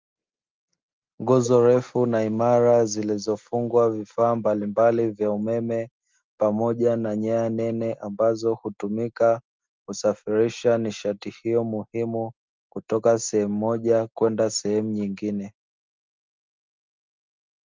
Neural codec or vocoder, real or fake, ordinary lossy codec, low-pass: none; real; Opus, 32 kbps; 7.2 kHz